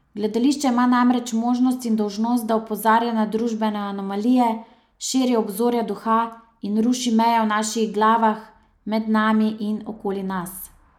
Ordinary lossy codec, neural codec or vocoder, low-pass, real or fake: none; none; 19.8 kHz; real